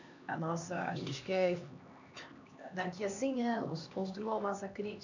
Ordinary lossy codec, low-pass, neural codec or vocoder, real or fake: none; 7.2 kHz; codec, 16 kHz, 2 kbps, X-Codec, HuBERT features, trained on LibriSpeech; fake